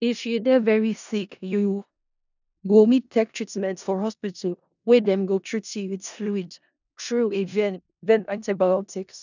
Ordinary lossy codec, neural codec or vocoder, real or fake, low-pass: none; codec, 16 kHz in and 24 kHz out, 0.4 kbps, LongCat-Audio-Codec, four codebook decoder; fake; 7.2 kHz